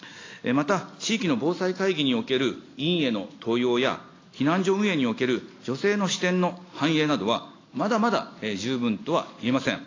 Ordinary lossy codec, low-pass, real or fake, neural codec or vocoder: AAC, 32 kbps; 7.2 kHz; real; none